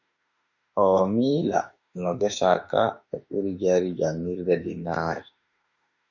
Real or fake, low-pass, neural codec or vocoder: fake; 7.2 kHz; autoencoder, 48 kHz, 32 numbers a frame, DAC-VAE, trained on Japanese speech